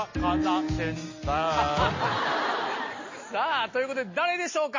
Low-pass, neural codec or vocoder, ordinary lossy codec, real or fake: 7.2 kHz; none; MP3, 32 kbps; real